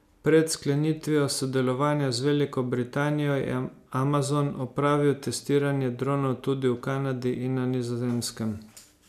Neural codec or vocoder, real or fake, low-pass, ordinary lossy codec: none; real; 14.4 kHz; none